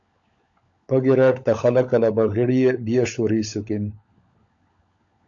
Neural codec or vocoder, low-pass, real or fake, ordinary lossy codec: codec, 16 kHz, 16 kbps, FunCodec, trained on LibriTTS, 50 frames a second; 7.2 kHz; fake; AAC, 64 kbps